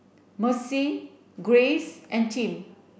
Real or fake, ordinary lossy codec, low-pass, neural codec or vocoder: real; none; none; none